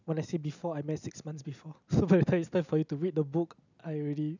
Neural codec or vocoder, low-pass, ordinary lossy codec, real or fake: none; 7.2 kHz; none; real